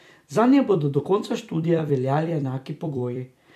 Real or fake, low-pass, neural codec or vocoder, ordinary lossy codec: fake; 14.4 kHz; vocoder, 44.1 kHz, 128 mel bands every 256 samples, BigVGAN v2; none